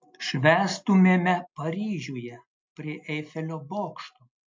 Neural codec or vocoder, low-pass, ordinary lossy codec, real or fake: none; 7.2 kHz; MP3, 48 kbps; real